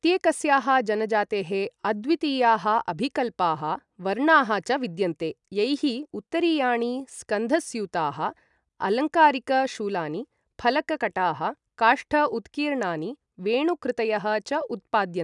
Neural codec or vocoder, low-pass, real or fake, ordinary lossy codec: none; 10.8 kHz; real; none